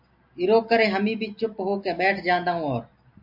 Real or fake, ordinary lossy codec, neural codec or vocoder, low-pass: real; MP3, 48 kbps; none; 5.4 kHz